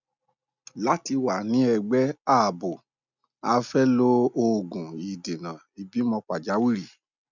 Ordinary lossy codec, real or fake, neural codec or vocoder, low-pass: none; real; none; 7.2 kHz